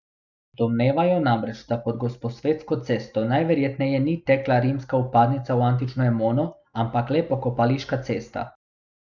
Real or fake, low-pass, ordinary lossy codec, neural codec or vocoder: real; 7.2 kHz; none; none